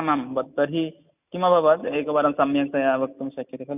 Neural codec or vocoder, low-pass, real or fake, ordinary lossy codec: none; 3.6 kHz; real; none